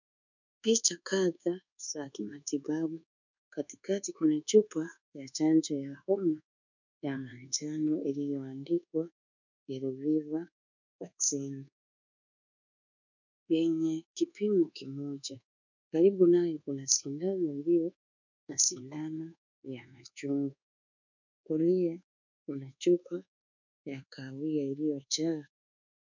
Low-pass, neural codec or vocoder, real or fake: 7.2 kHz; codec, 24 kHz, 1.2 kbps, DualCodec; fake